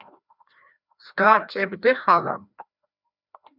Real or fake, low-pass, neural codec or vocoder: fake; 5.4 kHz; codec, 16 kHz, 1 kbps, FreqCodec, larger model